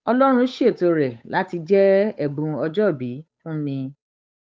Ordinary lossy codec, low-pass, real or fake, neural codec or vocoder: none; none; fake; codec, 16 kHz, 8 kbps, FunCodec, trained on Chinese and English, 25 frames a second